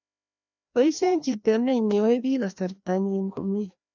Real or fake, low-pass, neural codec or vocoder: fake; 7.2 kHz; codec, 16 kHz, 1 kbps, FreqCodec, larger model